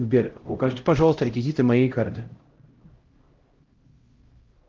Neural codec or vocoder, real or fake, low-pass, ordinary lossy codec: codec, 16 kHz, 0.5 kbps, X-Codec, HuBERT features, trained on LibriSpeech; fake; 7.2 kHz; Opus, 16 kbps